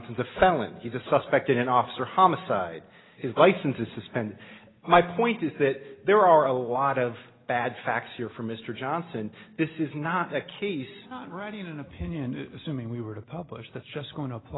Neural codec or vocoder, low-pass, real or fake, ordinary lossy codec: none; 7.2 kHz; real; AAC, 16 kbps